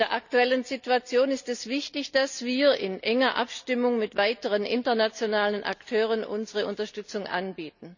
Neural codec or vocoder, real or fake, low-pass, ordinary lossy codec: none; real; 7.2 kHz; none